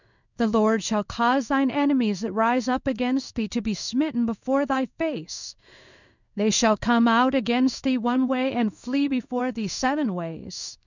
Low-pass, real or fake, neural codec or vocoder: 7.2 kHz; fake; codec, 16 kHz in and 24 kHz out, 1 kbps, XY-Tokenizer